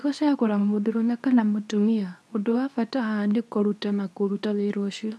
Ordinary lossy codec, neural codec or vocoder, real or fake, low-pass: none; codec, 24 kHz, 0.9 kbps, WavTokenizer, medium speech release version 2; fake; none